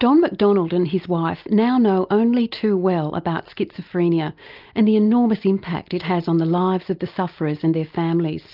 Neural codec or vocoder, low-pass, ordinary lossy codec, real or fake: none; 5.4 kHz; Opus, 24 kbps; real